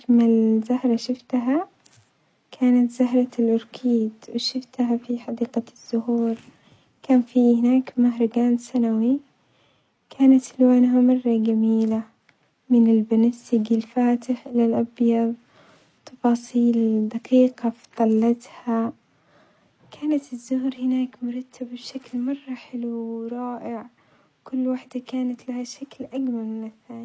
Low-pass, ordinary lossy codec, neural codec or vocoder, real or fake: none; none; none; real